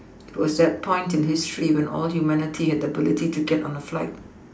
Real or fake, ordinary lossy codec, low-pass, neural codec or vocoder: real; none; none; none